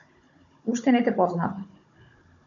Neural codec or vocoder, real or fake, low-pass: codec, 16 kHz, 16 kbps, FunCodec, trained on Chinese and English, 50 frames a second; fake; 7.2 kHz